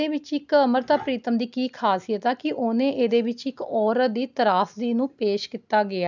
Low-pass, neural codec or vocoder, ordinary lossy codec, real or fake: 7.2 kHz; none; none; real